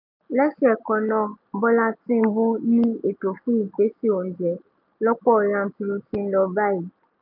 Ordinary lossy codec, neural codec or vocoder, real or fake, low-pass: none; none; real; 5.4 kHz